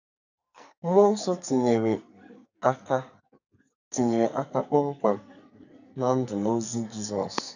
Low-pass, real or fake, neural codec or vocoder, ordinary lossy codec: 7.2 kHz; fake; codec, 44.1 kHz, 2.6 kbps, SNAC; none